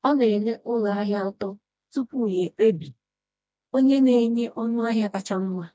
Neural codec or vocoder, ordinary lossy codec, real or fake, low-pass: codec, 16 kHz, 1 kbps, FreqCodec, smaller model; none; fake; none